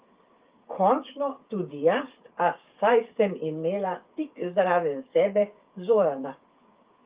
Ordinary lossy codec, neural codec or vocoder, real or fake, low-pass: Opus, 32 kbps; codec, 16 kHz, 8 kbps, FreqCodec, smaller model; fake; 3.6 kHz